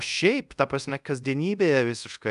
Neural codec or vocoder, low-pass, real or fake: codec, 24 kHz, 0.5 kbps, DualCodec; 10.8 kHz; fake